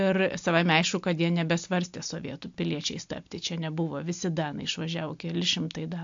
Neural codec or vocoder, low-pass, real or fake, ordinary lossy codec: none; 7.2 kHz; real; AAC, 64 kbps